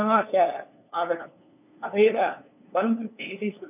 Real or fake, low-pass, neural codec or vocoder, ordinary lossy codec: fake; 3.6 kHz; codec, 16 kHz, 2 kbps, FunCodec, trained on LibriTTS, 25 frames a second; none